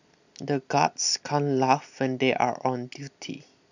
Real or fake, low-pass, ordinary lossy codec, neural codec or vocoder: real; 7.2 kHz; none; none